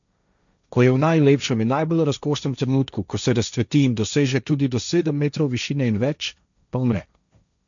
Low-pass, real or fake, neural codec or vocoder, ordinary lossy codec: 7.2 kHz; fake; codec, 16 kHz, 1.1 kbps, Voila-Tokenizer; none